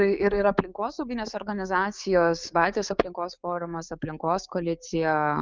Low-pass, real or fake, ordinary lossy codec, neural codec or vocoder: 7.2 kHz; fake; Opus, 24 kbps; codec, 16 kHz, 6 kbps, DAC